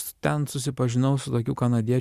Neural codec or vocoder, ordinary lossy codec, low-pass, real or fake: none; Opus, 64 kbps; 14.4 kHz; real